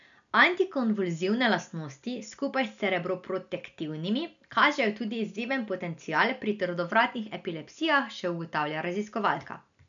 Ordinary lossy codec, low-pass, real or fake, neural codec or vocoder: none; 7.2 kHz; real; none